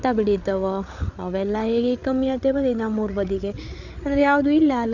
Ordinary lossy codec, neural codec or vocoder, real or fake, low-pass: none; codec, 16 kHz, 8 kbps, FreqCodec, larger model; fake; 7.2 kHz